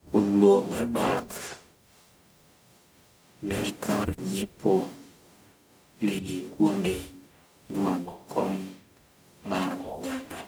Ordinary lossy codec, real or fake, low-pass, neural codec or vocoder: none; fake; none; codec, 44.1 kHz, 0.9 kbps, DAC